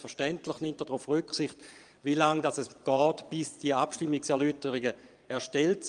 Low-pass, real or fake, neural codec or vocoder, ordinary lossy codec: 9.9 kHz; fake; vocoder, 22.05 kHz, 80 mel bands, Vocos; Opus, 32 kbps